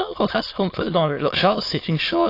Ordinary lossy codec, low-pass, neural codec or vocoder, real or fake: AAC, 32 kbps; 5.4 kHz; autoencoder, 22.05 kHz, a latent of 192 numbers a frame, VITS, trained on many speakers; fake